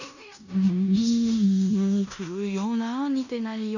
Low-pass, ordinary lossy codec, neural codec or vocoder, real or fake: 7.2 kHz; none; codec, 16 kHz in and 24 kHz out, 0.9 kbps, LongCat-Audio-Codec, fine tuned four codebook decoder; fake